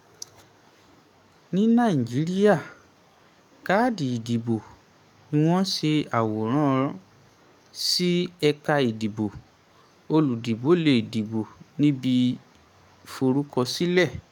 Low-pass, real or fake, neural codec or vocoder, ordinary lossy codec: 19.8 kHz; fake; codec, 44.1 kHz, 7.8 kbps, DAC; none